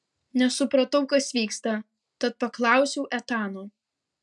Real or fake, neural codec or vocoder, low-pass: real; none; 10.8 kHz